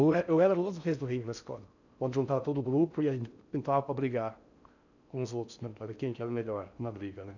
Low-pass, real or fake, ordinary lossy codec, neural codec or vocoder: 7.2 kHz; fake; none; codec, 16 kHz in and 24 kHz out, 0.6 kbps, FocalCodec, streaming, 4096 codes